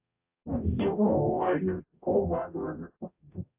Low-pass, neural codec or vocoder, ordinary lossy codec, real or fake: 3.6 kHz; codec, 44.1 kHz, 0.9 kbps, DAC; AAC, 32 kbps; fake